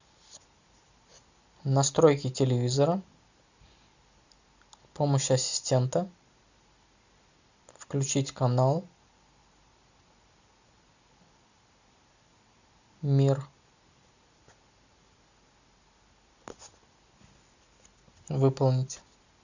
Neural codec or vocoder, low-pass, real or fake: none; 7.2 kHz; real